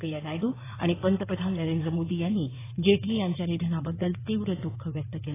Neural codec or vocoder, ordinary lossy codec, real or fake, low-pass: codec, 16 kHz in and 24 kHz out, 2.2 kbps, FireRedTTS-2 codec; AAC, 16 kbps; fake; 3.6 kHz